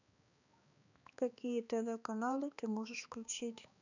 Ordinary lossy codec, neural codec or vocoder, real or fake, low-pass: none; codec, 16 kHz, 2 kbps, X-Codec, HuBERT features, trained on balanced general audio; fake; 7.2 kHz